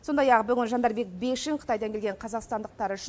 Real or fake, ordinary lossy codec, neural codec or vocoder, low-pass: real; none; none; none